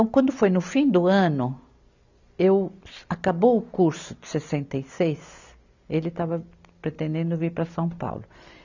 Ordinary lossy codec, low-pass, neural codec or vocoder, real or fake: none; 7.2 kHz; none; real